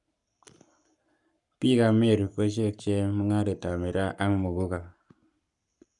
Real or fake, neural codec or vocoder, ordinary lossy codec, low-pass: fake; codec, 44.1 kHz, 7.8 kbps, Pupu-Codec; none; 10.8 kHz